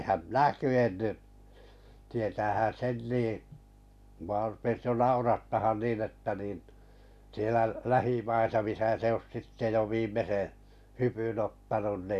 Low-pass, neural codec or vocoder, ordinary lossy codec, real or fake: 14.4 kHz; none; none; real